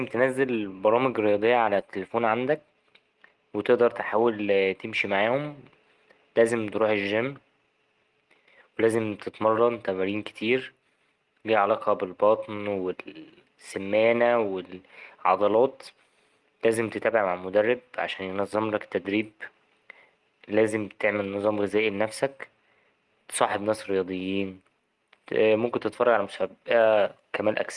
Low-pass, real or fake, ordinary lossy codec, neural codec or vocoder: 10.8 kHz; real; Opus, 24 kbps; none